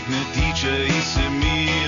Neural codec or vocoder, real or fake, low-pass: none; real; 7.2 kHz